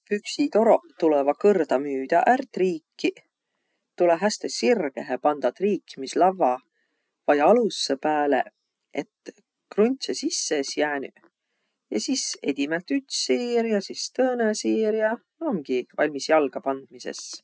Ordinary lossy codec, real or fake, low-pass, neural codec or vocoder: none; real; none; none